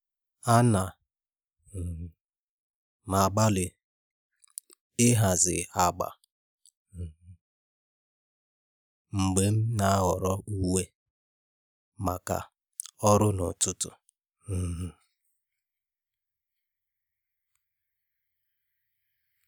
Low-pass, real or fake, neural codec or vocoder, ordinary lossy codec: none; real; none; none